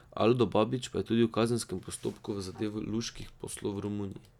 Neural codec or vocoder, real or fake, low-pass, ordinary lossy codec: none; real; 19.8 kHz; none